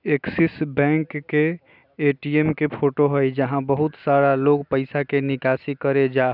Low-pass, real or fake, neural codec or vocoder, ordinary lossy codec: 5.4 kHz; real; none; none